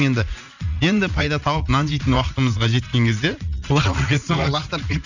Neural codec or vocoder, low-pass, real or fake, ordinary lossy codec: vocoder, 44.1 kHz, 80 mel bands, Vocos; 7.2 kHz; fake; none